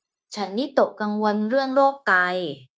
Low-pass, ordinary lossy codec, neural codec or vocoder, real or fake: none; none; codec, 16 kHz, 0.9 kbps, LongCat-Audio-Codec; fake